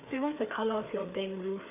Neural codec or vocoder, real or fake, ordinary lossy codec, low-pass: codec, 16 kHz, 4 kbps, FreqCodec, larger model; fake; none; 3.6 kHz